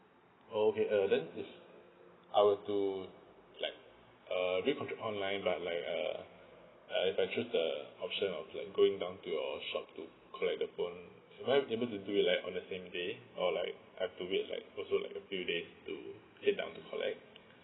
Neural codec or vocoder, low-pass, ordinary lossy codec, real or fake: none; 7.2 kHz; AAC, 16 kbps; real